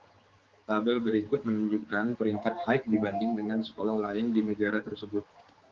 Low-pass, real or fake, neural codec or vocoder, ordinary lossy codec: 7.2 kHz; fake; codec, 16 kHz, 4 kbps, X-Codec, HuBERT features, trained on general audio; Opus, 32 kbps